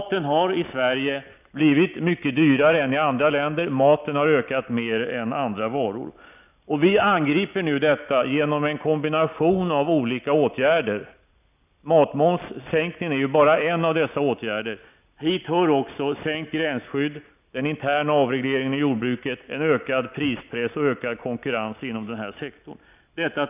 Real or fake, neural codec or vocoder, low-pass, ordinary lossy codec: real; none; 3.6 kHz; none